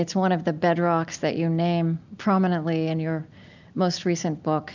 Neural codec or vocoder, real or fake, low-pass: none; real; 7.2 kHz